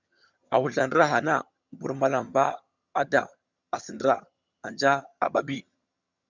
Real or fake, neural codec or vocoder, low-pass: fake; vocoder, 22.05 kHz, 80 mel bands, HiFi-GAN; 7.2 kHz